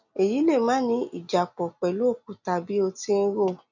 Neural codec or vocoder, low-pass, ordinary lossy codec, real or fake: none; 7.2 kHz; none; real